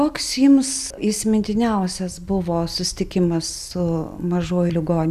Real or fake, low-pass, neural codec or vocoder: real; 14.4 kHz; none